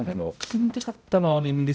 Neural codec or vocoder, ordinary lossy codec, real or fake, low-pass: codec, 16 kHz, 0.5 kbps, X-Codec, HuBERT features, trained on balanced general audio; none; fake; none